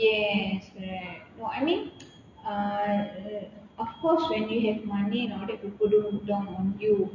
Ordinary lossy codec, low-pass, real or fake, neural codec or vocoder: none; 7.2 kHz; real; none